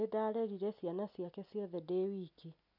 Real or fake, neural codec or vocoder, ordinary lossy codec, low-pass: real; none; none; 5.4 kHz